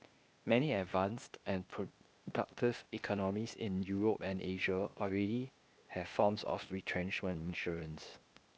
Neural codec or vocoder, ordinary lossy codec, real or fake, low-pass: codec, 16 kHz, 0.8 kbps, ZipCodec; none; fake; none